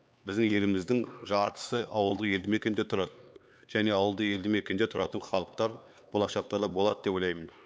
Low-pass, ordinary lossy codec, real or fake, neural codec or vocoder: none; none; fake; codec, 16 kHz, 4 kbps, X-Codec, HuBERT features, trained on LibriSpeech